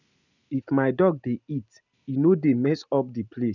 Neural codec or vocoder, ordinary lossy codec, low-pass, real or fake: none; none; 7.2 kHz; real